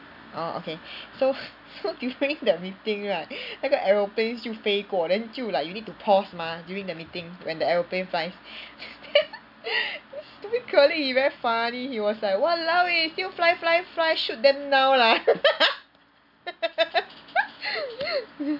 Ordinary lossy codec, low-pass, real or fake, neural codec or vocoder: none; 5.4 kHz; real; none